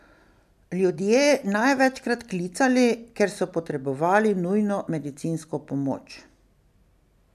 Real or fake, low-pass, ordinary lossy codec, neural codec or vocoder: real; 14.4 kHz; none; none